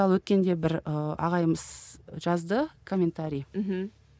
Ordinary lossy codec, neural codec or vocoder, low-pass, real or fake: none; none; none; real